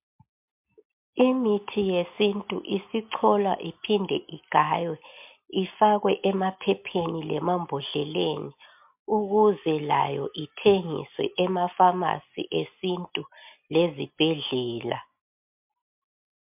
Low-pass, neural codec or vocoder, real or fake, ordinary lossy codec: 3.6 kHz; none; real; MP3, 32 kbps